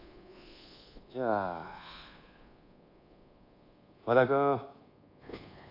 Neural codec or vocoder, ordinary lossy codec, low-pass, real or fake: codec, 24 kHz, 1.2 kbps, DualCodec; AAC, 32 kbps; 5.4 kHz; fake